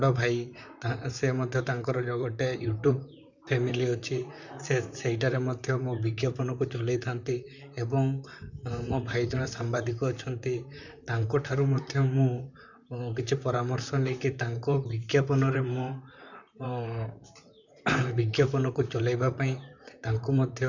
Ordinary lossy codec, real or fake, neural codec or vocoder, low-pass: none; fake; vocoder, 44.1 kHz, 128 mel bands, Pupu-Vocoder; 7.2 kHz